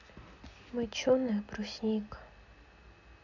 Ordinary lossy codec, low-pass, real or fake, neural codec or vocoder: none; 7.2 kHz; real; none